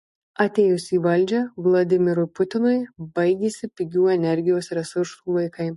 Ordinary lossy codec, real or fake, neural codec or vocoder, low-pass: MP3, 48 kbps; real; none; 14.4 kHz